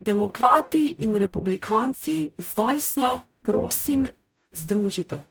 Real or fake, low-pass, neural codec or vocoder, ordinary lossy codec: fake; none; codec, 44.1 kHz, 0.9 kbps, DAC; none